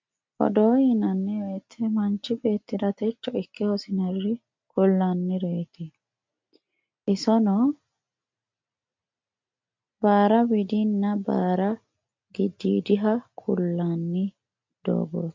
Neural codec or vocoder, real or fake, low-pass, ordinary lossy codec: none; real; 7.2 kHz; MP3, 48 kbps